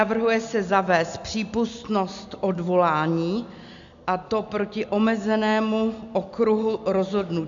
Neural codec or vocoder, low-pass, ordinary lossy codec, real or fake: none; 7.2 kHz; MP3, 64 kbps; real